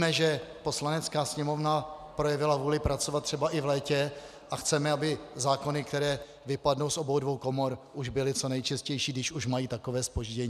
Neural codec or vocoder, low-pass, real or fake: none; 14.4 kHz; real